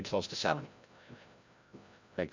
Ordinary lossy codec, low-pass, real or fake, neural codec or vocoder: MP3, 64 kbps; 7.2 kHz; fake; codec, 16 kHz, 0.5 kbps, FreqCodec, larger model